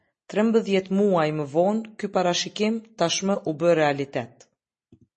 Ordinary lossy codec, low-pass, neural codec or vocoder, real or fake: MP3, 32 kbps; 10.8 kHz; none; real